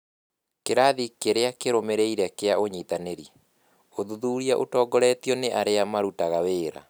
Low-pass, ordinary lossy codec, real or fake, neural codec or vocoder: none; none; real; none